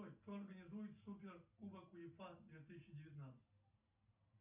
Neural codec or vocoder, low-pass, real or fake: none; 3.6 kHz; real